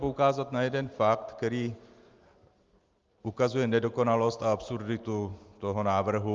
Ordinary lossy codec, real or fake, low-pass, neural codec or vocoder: Opus, 32 kbps; real; 7.2 kHz; none